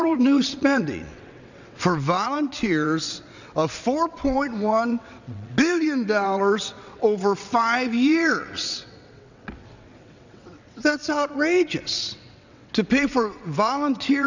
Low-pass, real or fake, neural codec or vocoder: 7.2 kHz; fake; vocoder, 22.05 kHz, 80 mel bands, WaveNeXt